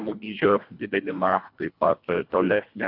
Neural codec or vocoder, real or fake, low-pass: codec, 24 kHz, 1.5 kbps, HILCodec; fake; 5.4 kHz